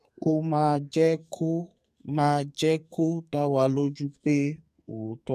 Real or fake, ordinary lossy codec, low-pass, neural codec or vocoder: fake; MP3, 96 kbps; 14.4 kHz; codec, 44.1 kHz, 2.6 kbps, SNAC